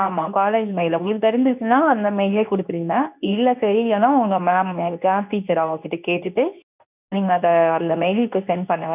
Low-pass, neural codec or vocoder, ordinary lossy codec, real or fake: 3.6 kHz; codec, 24 kHz, 0.9 kbps, WavTokenizer, medium speech release version 2; none; fake